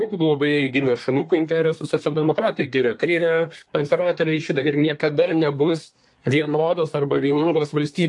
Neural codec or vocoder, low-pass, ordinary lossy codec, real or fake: codec, 24 kHz, 1 kbps, SNAC; 10.8 kHz; AAC, 64 kbps; fake